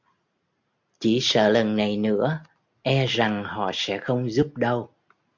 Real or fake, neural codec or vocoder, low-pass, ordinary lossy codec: real; none; 7.2 kHz; MP3, 64 kbps